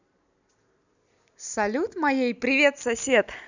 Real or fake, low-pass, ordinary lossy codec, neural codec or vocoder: real; 7.2 kHz; none; none